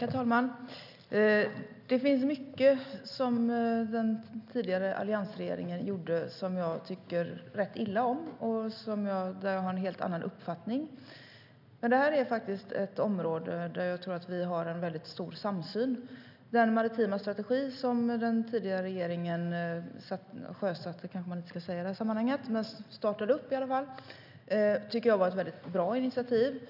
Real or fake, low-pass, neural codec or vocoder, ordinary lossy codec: real; 5.4 kHz; none; none